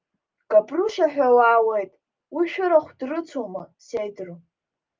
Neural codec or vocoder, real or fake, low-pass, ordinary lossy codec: none; real; 7.2 kHz; Opus, 24 kbps